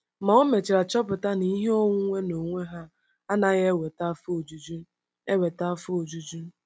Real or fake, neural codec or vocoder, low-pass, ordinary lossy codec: real; none; none; none